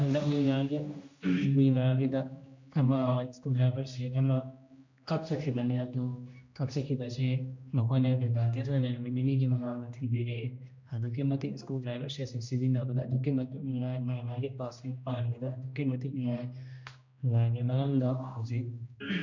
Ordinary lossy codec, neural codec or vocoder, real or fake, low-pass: MP3, 64 kbps; codec, 16 kHz, 1 kbps, X-Codec, HuBERT features, trained on general audio; fake; 7.2 kHz